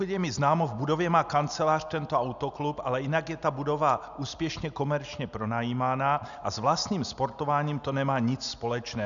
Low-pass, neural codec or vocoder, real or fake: 7.2 kHz; none; real